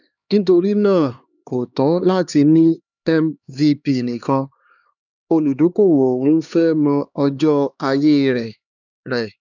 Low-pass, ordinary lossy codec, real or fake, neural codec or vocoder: 7.2 kHz; none; fake; codec, 16 kHz, 2 kbps, X-Codec, HuBERT features, trained on LibriSpeech